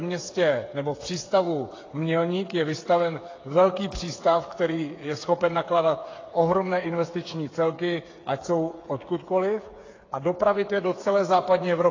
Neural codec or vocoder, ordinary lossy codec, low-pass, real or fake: codec, 16 kHz, 8 kbps, FreqCodec, smaller model; AAC, 32 kbps; 7.2 kHz; fake